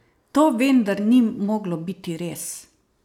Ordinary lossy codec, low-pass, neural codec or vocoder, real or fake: none; 19.8 kHz; none; real